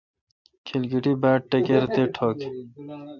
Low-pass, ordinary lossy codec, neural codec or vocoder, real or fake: 7.2 kHz; MP3, 64 kbps; none; real